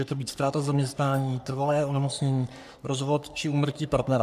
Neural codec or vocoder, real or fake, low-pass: codec, 44.1 kHz, 3.4 kbps, Pupu-Codec; fake; 14.4 kHz